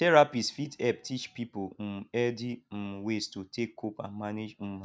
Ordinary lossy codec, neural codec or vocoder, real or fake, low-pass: none; none; real; none